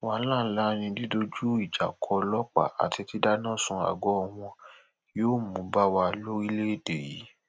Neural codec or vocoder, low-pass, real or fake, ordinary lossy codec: none; none; real; none